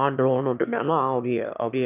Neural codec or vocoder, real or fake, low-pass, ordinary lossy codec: autoencoder, 22.05 kHz, a latent of 192 numbers a frame, VITS, trained on one speaker; fake; 3.6 kHz; none